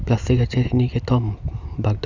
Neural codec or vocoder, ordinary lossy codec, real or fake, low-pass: none; none; real; 7.2 kHz